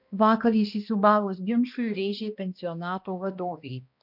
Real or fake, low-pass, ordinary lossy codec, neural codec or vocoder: fake; 5.4 kHz; MP3, 48 kbps; codec, 16 kHz, 1 kbps, X-Codec, HuBERT features, trained on balanced general audio